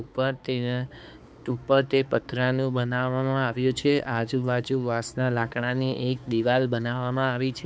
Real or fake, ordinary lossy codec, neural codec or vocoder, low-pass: fake; none; codec, 16 kHz, 4 kbps, X-Codec, HuBERT features, trained on balanced general audio; none